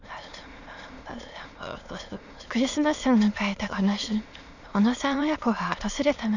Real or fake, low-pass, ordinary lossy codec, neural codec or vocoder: fake; 7.2 kHz; none; autoencoder, 22.05 kHz, a latent of 192 numbers a frame, VITS, trained on many speakers